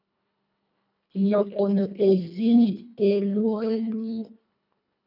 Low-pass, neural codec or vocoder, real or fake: 5.4 kHz; codec, 24 kHz, 1.5 kbps, HILCodec; fake